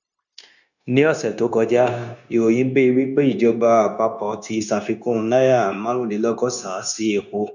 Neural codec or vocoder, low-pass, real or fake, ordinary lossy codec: codec, 16 kHz, 0.9 kbps, LongCat-Audio-Codec; 7.2 kHz; fake; none